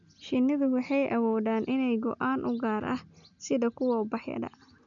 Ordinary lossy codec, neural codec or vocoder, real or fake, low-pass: none; none; real; 7.2 kHz